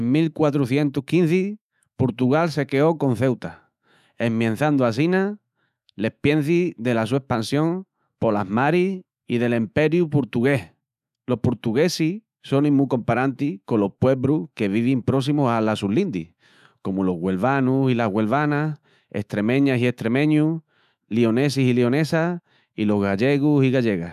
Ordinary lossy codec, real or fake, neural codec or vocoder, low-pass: none; fake; autoencoder, 48 kHz, 128 numbers a frame, DAC-VAE, trained on Japanese speech; 14.4 kHz